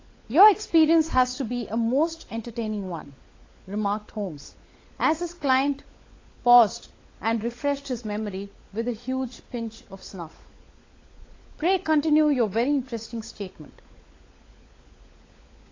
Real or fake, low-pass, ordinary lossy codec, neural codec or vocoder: fake; 7.2 kHz; AAC, 32 kbps; codec, 16 kHz, 16 kbps, FunCodec, trained on LibriTTS, 50 frames a second